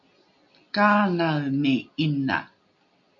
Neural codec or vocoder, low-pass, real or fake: none; 7.2 kHz; real